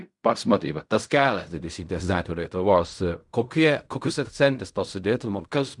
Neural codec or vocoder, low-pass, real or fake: codec, 16 kHz in and 24 kHz out, 0.4 kbps, LongCat-Audio-Codec, fine tuned four codebook decoder; 10.8 kHz; fake